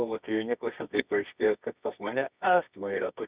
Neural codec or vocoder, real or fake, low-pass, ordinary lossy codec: codec, 24 kHz, 0.9 kbps, WavTokenizer, medium music audio release; fake; 3.6 kHz; Opus, 64 kbps